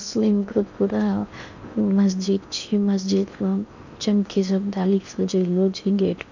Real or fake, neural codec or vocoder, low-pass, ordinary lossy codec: fake; codec, 16 kHz in and 24 kHz out, 0.8 kbps, FocalCodec, streaming, 65536 codes; 7.2 kHz; none